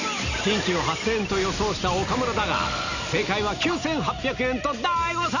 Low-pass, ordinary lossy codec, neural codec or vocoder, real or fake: 7.2 kHz; none; none; real